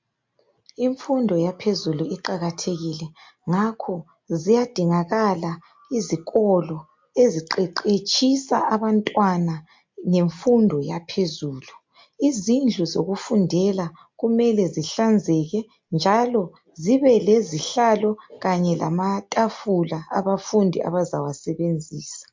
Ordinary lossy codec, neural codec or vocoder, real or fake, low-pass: MP3, 48 kbps; none; real; 7.2 kHz